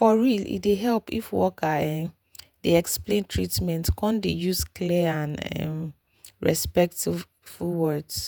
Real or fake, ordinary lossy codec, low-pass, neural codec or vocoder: fake; none; none; vocoder, 48 kHz, 128 mel bands, Vocos